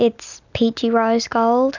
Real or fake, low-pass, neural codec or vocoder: real; 7.2 kHz; none